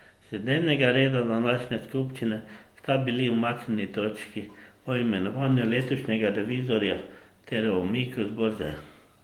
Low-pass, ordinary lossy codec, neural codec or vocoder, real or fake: 19.8 kHz; Opus, 24 kbps; vocoder, 48 kHz, 128 mel bands, Vocos; fake